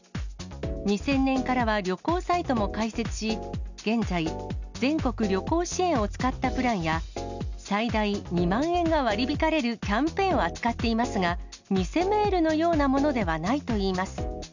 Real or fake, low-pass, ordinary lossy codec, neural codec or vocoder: real; 7.2 kHz; none; none